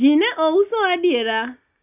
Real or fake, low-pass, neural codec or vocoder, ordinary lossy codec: real; 3.6 kHz; none; none